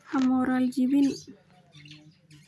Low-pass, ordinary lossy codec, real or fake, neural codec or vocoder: none; none; real; none